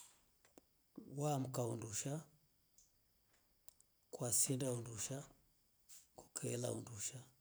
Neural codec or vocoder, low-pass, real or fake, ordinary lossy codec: none; none; real; none